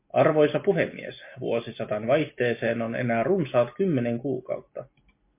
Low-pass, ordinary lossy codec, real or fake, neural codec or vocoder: 3.6 kHz; MP3, 24 kbps; real; none